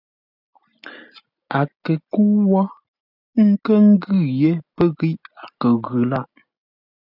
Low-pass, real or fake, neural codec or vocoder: 5.4 kHz; real; none